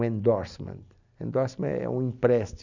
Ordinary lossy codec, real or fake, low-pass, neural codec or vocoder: none; real; 7.2 kHz; none